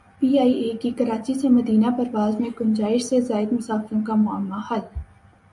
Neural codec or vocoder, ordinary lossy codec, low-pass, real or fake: none; MP3, 64 kbps; 10.8 kHz; real